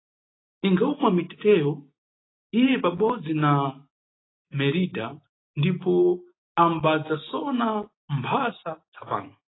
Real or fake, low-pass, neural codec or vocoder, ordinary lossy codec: real; 7.2 kHz; none; AAC, 16 kbps